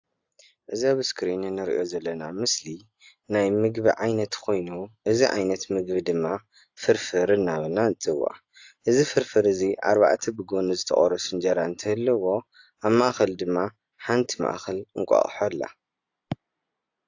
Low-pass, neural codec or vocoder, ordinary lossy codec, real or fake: 7.2 kHz; none; AAC, 48 kbps; real